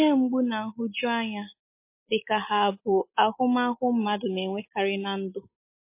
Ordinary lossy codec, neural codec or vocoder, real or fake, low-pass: MP3, 24 kbps; none; real; 3.6 kHz